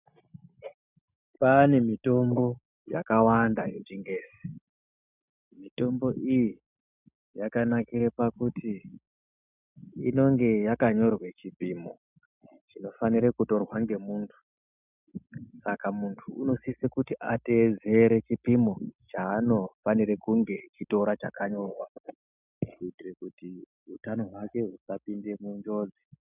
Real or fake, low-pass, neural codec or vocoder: real; 3.6 kHz; none